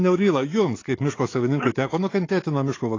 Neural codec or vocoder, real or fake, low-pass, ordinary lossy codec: codec, 44.1 kHz, 7.8 kbps, DAC; fake; 7.2 kHz; AAC, 32 kbps